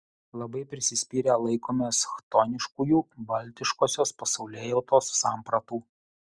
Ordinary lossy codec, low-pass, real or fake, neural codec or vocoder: Opus, 64 kbps; 9.9 kHz; real; none